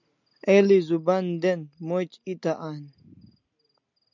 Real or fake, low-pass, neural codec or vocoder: real; 7.2 kHz; none